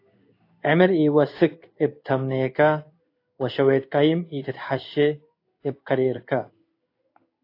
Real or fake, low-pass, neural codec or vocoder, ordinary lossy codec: fake; 5.4 kHz; codec, 16 kHz in and 24 kHz out, 1 kbps, XY-Tokenizer; AAC, 32 kbps